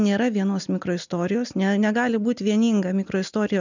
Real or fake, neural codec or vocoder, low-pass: real; none; 7.2 kHz